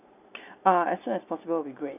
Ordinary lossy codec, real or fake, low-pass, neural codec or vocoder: none; real; 3.6 kHz; none